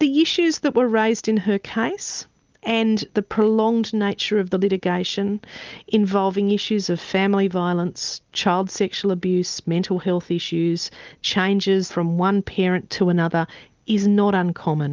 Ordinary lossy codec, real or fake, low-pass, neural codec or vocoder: Opus, 32 kbps; real; 7.2 kHz; none